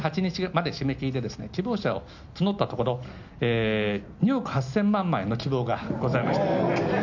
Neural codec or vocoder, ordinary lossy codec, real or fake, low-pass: none; none; real; 7.2 kHz